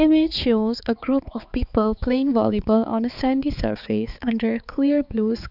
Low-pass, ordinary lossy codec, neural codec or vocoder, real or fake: 5.4 kHz; none; codec, 16 kHz, 4 kbps, X-Codec, HuBERT features, trained on balanced general audio; fake